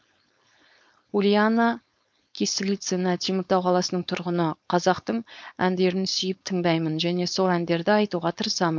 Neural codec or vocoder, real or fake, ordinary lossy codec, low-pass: codec, 16 kHz, 4.8 kbps, FACodec; fake; none; none